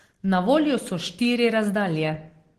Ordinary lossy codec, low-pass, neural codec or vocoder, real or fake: Opus, 16 kbps; 14.4 kHz; none; real